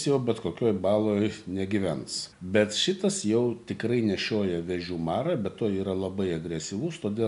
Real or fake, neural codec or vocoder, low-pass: real; none; 10.8 kHz